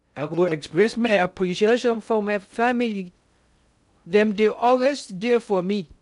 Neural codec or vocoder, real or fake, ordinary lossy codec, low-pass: codec, 16 kHz in and 24 kHz out, 0.6 kbps, FocalCodec, streaming, 2048 codes; fake; none; 10.8 kHz